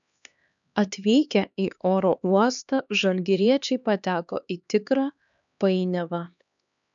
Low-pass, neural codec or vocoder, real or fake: 7.2 kHz; codec, 16 kHz, 2 kbps, X-Codec, HuBERT features, trained on LibriSpeech; fake